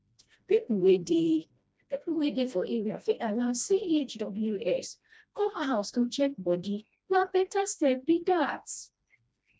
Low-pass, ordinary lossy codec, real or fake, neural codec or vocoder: none; none; fake; codec, 16 kHz, 1 kbps, FreqCodec, smaller model